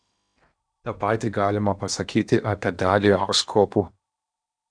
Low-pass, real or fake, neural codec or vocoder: 9.9 kHz; fake; codec, 16 kHz in and 24 kHz out, 0.8 kbps, FocalCodec, streaming, 65536 codes